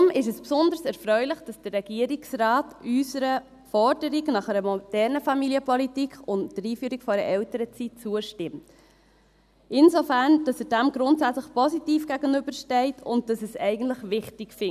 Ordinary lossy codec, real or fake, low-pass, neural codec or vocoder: none; real; 14.4 kHz; none